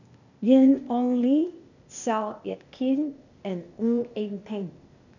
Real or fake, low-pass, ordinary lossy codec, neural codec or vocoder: fake; 7.2 kHz; none; codec, 16 kHz, 0.8 kbps, ZipCodec